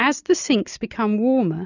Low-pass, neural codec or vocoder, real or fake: 7.2 kHz; none; real